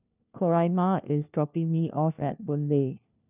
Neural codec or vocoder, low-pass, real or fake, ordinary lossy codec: codec, 16 kHz, 1 kbps, FunCodec, trained on LibriTTS, 50 frames a second; 3.6 kHz; fake; none